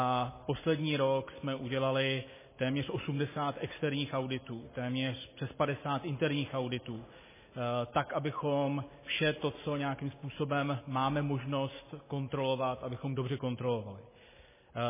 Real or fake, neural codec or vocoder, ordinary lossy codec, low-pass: real; none; MP3, 16 kbps; 3.6 kHz